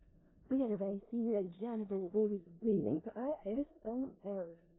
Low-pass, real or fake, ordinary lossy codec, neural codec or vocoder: 7.2 kHz; fake; AAC, 16 kbps; codec, 16 kHz in and 24 kHz out, 0.4 kbps, LongCat-Audio-Codec, four codebook decoder